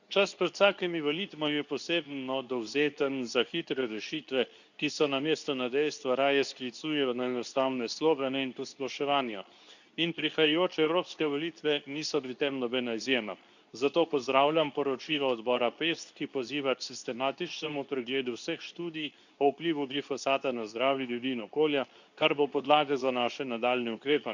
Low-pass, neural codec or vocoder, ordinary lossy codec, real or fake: 7.2 kHz; codec, 24 kHz, 0.9 kbps, WavTokenizer, medium speech release version 2; none; fake